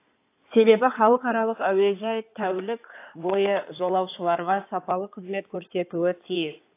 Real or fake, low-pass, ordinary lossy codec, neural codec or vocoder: fake; 3.6 kHz; AAC, 24 kbps; codec, 16 kHz in and 24 kHz out, 2.2 kbps, FireRedTTS-2 codec